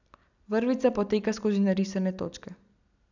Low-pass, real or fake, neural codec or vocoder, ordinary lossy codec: 7.2 kHz; real; none; none